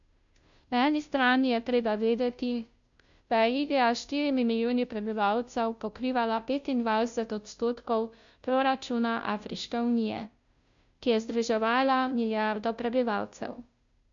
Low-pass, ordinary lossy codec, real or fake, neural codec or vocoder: 7.2 kHz; MP3, 64 kbps; fake; codec, 16 kHz, 0.5 kbps, FunCodec, trained on Chinese and English, 25 frames a second